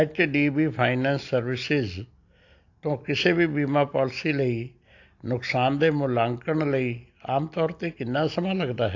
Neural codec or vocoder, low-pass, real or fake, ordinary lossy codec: none; 7.2 kHz; real; AAC, 48 kbps